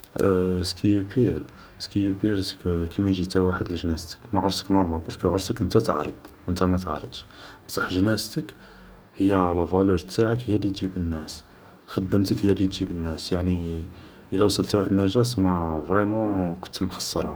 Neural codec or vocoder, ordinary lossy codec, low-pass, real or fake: codec, 44.1 kHz, 2.6 kbps, DAC; none; none; fake